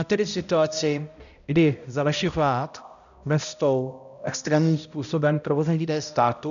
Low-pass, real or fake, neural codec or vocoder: 7.2 kHz; fake; codec, 16 kHz, 0.5 kbps, X-Codec, HuBERT features, trained on balanced general audio